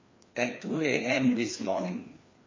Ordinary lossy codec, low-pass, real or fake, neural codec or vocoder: MP3, 32 kbps; 7.2 kHz; fake; codec, 16 kHz, 2 kbps, FreqCodec, larger model